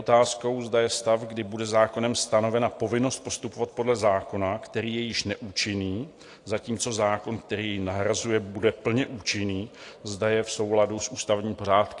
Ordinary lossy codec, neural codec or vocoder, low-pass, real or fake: AAC, 48 kbps; none; 10.8 kHz; real